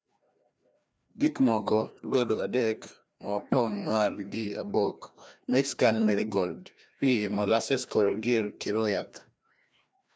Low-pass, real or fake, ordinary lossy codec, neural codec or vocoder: none; fake; none; codec, 16 kHz, 1 kbps, FreqCodec, larger model